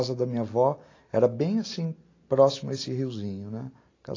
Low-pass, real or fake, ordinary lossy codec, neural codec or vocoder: 7.2 kHz; real; AAC, 32 kbps; none